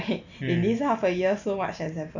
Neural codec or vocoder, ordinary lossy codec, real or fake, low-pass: none; none; real; 7.2 kHz